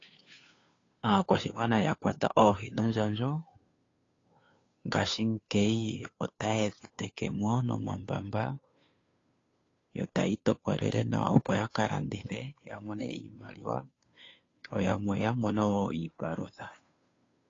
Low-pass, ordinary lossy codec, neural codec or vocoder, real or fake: 7.2 kHz; AAC, 32 kbps; codec, 16 kHz, 2 kbps, FunCodec, trained on Chinese and English, 25 frames a second; fake